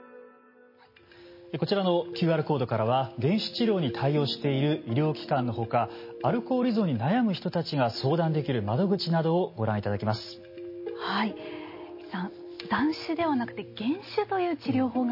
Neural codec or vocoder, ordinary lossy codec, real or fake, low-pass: none; MP3, 24 kbps; real; 5.4 kHz